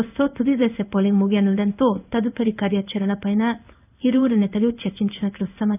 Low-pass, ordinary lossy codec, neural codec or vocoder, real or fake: 3.6 kHz; none; codec, 16 kHz in and 24 kHz out, 1 kbps, XY-Tokenizer; fake